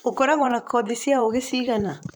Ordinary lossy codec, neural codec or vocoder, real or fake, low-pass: none; vocoder, 44.1 kHz, 128 mel bands, Pupu-Vocoder; fake; none